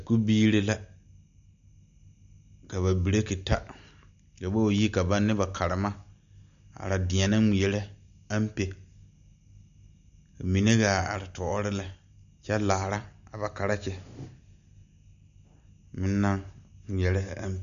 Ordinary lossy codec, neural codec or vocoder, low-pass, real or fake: AAC, 96 kbps; none; 7.2 kHz; real